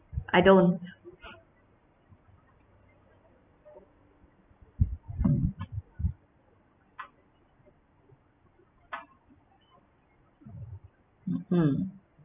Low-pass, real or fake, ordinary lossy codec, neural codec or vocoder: 3.6 kHz; real; none; none